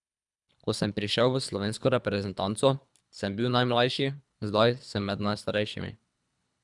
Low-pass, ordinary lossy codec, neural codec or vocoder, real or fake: 10.8 kHz; none; codec, 24 kHz, 3 kbps, HILCodec; fake